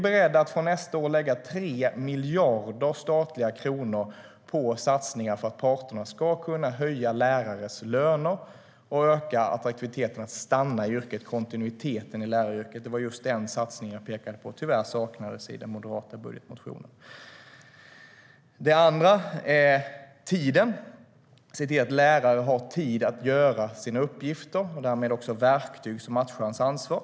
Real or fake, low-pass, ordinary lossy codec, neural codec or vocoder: real; none; none; none